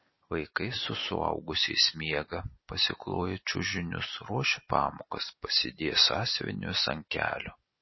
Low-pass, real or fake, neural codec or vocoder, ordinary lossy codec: 7.2 kHz; real; none; MP3, 24 kbps